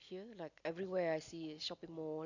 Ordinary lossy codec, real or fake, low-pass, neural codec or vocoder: none; real; 7.2 kHz; none